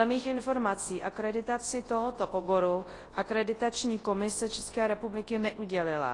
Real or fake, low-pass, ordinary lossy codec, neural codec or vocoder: fake; 10.8 kHz; AAC, 32 kbps; codec, 24 kHz, 0.9 kbps, WavTokenizer, large speech release